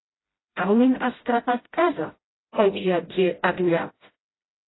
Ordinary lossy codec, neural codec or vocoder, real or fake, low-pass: AAC, 16 kbps; codec, 16 kHz, 0.5 kbps, FreqCodec, smaller model; fake; 7.2 kHz